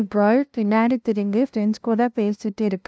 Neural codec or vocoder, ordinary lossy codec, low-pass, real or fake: codec, 16 kHz, 0.5 kbps, FunCodec, trained on LibriTTS, 25 frames a second; none; none; fake